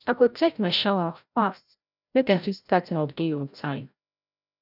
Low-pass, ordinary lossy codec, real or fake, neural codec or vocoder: 5.4 kHz; none; fake; codec, 16 kHz, 0.5 kbps, FreqCodec, larger model